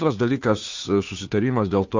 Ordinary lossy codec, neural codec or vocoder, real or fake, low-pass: AAC, 48 kbps; codec, 16 kHz, 2 kbps, FunCodec, trained on Chinese and English, 25 frames a second; fake; 7.2 kHz